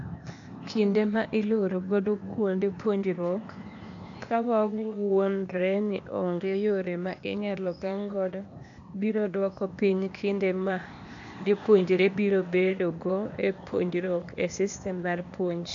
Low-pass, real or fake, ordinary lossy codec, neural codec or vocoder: 7.2 kHz; fake; AAC, 64 kbps; codec, 16 kHz, 0.8 kbps, ZipCodec